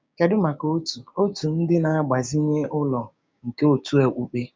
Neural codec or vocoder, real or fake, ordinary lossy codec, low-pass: codec, 16 kHz, 6 kbps, DAC; fake; none; none